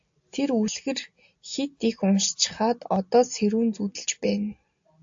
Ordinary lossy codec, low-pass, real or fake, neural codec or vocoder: AAC, 64 kbps; 7.2 kHz; real; none